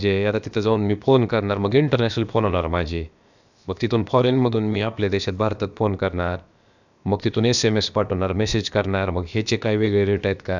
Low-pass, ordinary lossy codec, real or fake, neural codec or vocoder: 7.2 kHz; none; fake; codec, 16 kHz, about 1 kbps, DyCAST, with the encoder's durations